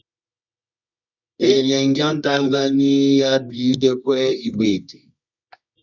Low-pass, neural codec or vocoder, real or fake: 7.2 kHz; codec, 24 kHz, 0.9 kbps, WavTokenizer, medium music audio release; fake